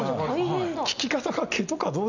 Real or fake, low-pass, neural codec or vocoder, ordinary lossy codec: real; 7.2 kHz; none; none